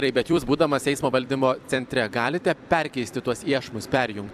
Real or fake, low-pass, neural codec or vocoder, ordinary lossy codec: fake; 14.4 kHz; vocoder, 44.1 kHz, 128 mel bands every 256 samples, BigVGAN v2; AAC, 96 kbps